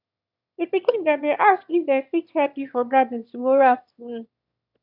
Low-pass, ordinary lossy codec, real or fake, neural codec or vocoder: 5.4 kHz; none; fake; autoencoder, 22.05 kHz, a latent of 192 numbers a frame, VITS, trained on one speaker